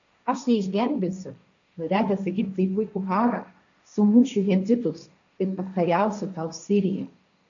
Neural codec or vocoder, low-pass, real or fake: codec, 16 kHz, 1.1 kbps, Voila-Tokenizer; 7.2 kHz; fake